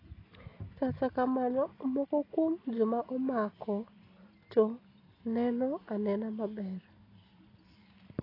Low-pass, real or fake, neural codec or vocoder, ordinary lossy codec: 5.4 kHz; real; none; AAC, 24 kbps